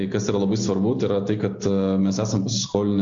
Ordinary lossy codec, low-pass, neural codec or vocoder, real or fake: AAC, 64 kbps; 7.2 kHz; none; real